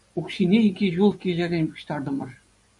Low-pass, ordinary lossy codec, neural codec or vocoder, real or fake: 10.8 kHz; MP3, 96 kbps; vocoder, 24 kHz, 100 mel bands, Vocos; fake